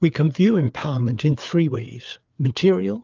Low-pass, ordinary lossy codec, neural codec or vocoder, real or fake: 7.2 kHz; Opus, 24 kbps; codec, 16 kHz, 4 kbps, FreqCodec, larger model; fake